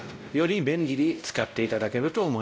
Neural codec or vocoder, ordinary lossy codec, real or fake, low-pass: codec, 16 kHz, 0.5 kbps, X-Codec, WavLM features, trained on Multilingual LibriSpeech; none; fake; none